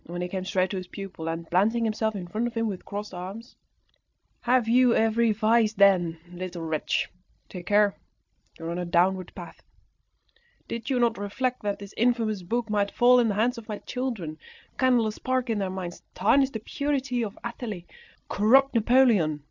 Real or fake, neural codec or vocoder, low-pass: real; none; 7.2 kHz